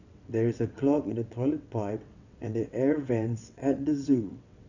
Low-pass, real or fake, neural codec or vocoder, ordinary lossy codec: 7.2 kHz; fake; vocoder, 22.05 kHz, 80 mel bands, WaveNeXt; none